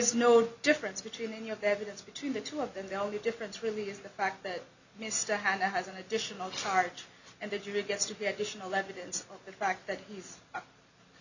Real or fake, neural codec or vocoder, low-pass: real; none; 7.2 kHz